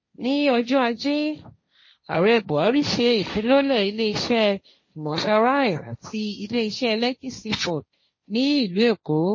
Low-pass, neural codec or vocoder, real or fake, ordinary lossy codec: 7.2 kHz; codec, 16 kHz, 1.1 kbps, Voila-Tokenizer; fake; MP3, 32 kbps